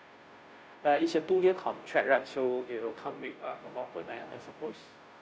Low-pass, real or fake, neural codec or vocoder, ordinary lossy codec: none; fake; codec, 16 kHz, 0.5 kbps, FunCodec, trained on Chinese and English, 25 frames a second; none